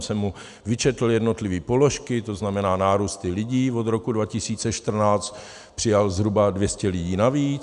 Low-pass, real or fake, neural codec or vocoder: 10.8 kHz; real; none